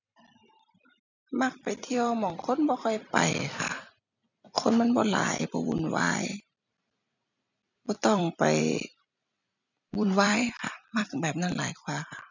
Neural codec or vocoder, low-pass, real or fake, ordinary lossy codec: vocoder, 44.1 kHz, 128 mel bands every 256 samples, BigVGAN v2; 7.2 kHz; fake; none